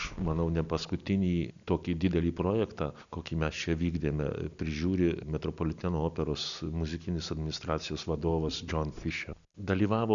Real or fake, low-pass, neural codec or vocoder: real; 7.2 kHz; none